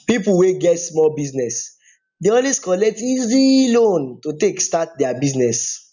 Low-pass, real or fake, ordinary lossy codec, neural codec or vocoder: 7.2 kHz; real; none; none